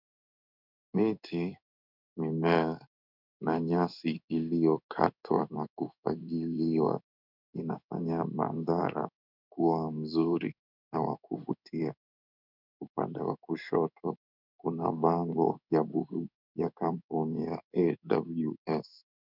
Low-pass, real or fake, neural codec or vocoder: 5.4 kHz; fake; codec, 16 kHz in and 24 kHz out, 1 kbps, XY-Tokenizer